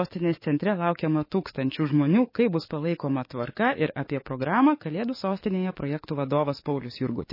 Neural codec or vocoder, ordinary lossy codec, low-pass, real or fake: codec, 44.1 kHz, 7.8 kbps, DAC; MP3, 24 kbps; 5.4 kHz; fake